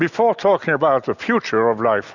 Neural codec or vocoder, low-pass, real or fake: none; 7.2 kHz; real